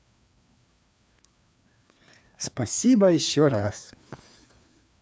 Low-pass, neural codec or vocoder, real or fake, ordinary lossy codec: none; codec, 16 kHz, 2 kbps, FreqCodec, larger model; fake; none